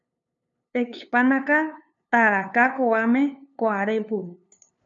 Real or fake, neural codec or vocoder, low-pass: fake; codec, 16 kHz, 2 kbps, FunCodec, trained on LibriTTS, 25 frames a second; 7.2 kHz